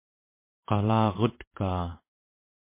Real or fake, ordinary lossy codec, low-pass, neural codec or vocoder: fake; MP3, 24 kbps; 3.6 kHz; vocoder, 44.1 kHz, 128 mel bands every 512 samples, BigVGAN v2